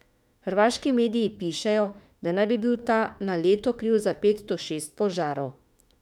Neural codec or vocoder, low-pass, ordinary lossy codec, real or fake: autoencoder, 48 kHz, 32 numbers a frame, DAC-VAE, trained on Japanese speech; 19.8 kHz; none; fake